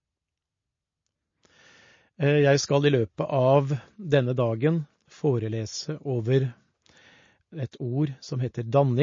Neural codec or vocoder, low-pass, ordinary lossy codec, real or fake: none; 7.2 kHz; MP3, 32 kbps; real